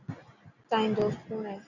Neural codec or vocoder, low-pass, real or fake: none; 7.2 kHz; real